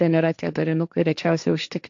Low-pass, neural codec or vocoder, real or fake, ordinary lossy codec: 7.2 kHz; codec, 16 kHz, 1.1 kbps, Voila-Tokenizer; fake; AAC, 64 kbps